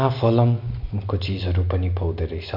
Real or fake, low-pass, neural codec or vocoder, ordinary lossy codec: real; 5.4 kHz; none; MP3, 48 kbps